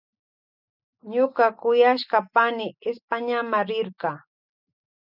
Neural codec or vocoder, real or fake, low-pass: none; real; 5.4 kHz